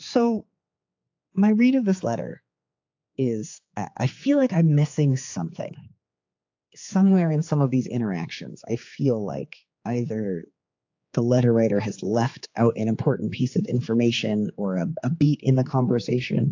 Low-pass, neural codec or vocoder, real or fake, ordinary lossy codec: 7.2 kHz; codec, 16 kHz, 4 kbps, X-Codec, HuBERT features, trained on general audio; fake; AAC, 48 kbps